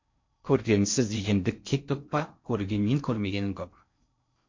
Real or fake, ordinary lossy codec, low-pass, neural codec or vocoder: fake; MP3, 48 kbps; 7.2 kHz; codec, 16 kHz in and 24 kHz out, 0.6 kbps, FocalCodec, streaming, 4096 codes